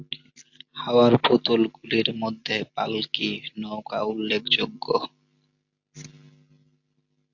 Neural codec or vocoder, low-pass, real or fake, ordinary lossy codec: none; 7.2 kHz; real; AAC, 48 kbps